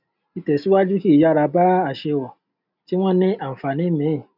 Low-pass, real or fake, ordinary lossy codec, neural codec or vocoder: 5.4 kHz; real; none; none